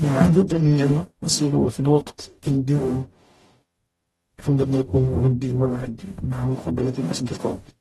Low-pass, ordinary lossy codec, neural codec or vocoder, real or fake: 19.8 kHz; AAC, 32 kbps; codec, 44.1 kHz, 0.9 kbps, DAC; fake